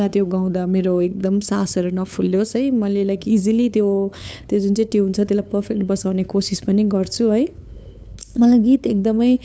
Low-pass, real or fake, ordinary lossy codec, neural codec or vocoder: none; fake; none; codec, 16 kHz, 8 kbps, FunCodec, trained on LibriTTS, 25 frames a second